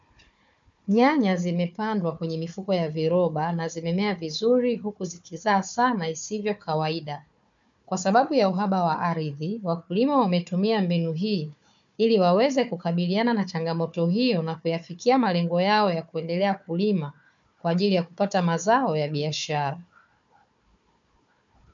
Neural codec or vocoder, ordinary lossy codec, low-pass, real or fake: codec, 16 kHz, 4 kbps, FunCodec, trained on Chinese and English, 50 frames a second; MP3, 64 kbps; 7.2 kHz; fake